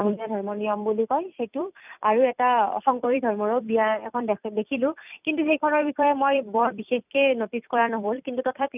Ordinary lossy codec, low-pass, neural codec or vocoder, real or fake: none; 3.6 kHz; vocoder, 44.1 kHz, 128 mel bands every 256 samples, BigVGAN v2; fake